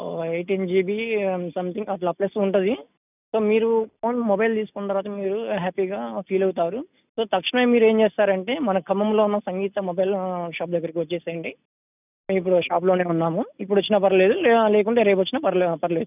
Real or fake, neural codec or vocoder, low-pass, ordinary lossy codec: real; none; 3.6 kHz; none